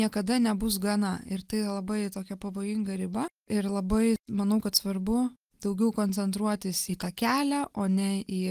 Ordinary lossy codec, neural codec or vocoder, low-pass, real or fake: Opus, 24 kbps; none; 14.4 kHz; real